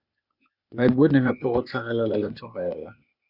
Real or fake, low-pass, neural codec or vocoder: fake; 5.4 kHz; codec, 16 kHz, 0.8 kbps, ZipCodec